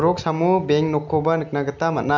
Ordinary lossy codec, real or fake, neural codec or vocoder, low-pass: none; real; none; 7.2 kHz